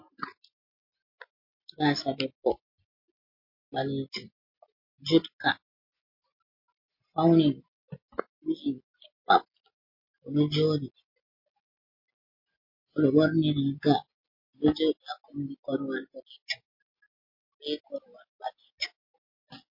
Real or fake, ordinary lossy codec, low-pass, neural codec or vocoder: real; MP3, 32 kbps; 5.4 kHz; none